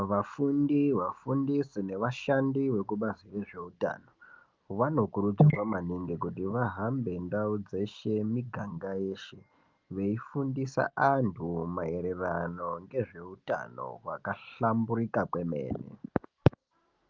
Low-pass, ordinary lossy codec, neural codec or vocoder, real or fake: 7.2 kHz; Opus, 32 kbps; none; real